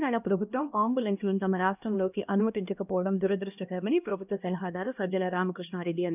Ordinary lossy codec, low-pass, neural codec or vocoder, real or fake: none; 3.6 kHz; codec, 16 kHz, 1 kbps, X-Codec, HuBERT features, trained on LibriSpeech; fake